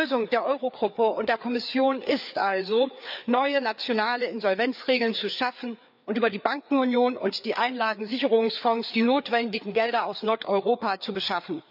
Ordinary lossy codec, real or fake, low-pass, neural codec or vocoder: none; fake; 5.4 kHz; codec, 16 kHz, 4 kbps, FreqCodec, larger model